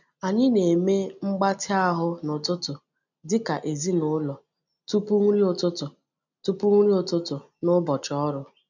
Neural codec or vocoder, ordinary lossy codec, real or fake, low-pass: none; none; real; 7.2 kHz